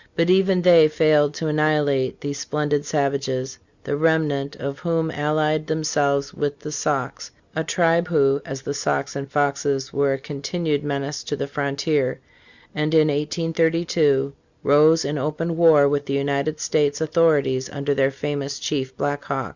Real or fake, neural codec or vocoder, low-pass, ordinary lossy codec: real; none; 7.2 kHz; Opus, 64 kbps